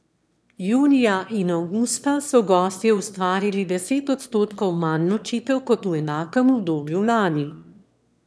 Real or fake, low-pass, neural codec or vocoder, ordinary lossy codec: fake; none; autoencoder, 22.05 kHz, a latent of 192 numbers a frame, VITS, trained on one speaker; none